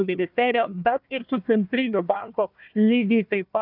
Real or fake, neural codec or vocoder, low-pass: fake; codec, 16 kHz, 1 kbps, FunCodec, trained on Chinese and English, 50 frames a second; 5.4 kHz